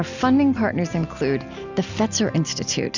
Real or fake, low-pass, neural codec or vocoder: real; 7.2 kHz; none